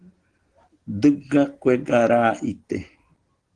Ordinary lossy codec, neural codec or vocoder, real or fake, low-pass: Opus, 16 kbps; none; real; 9.9 kHz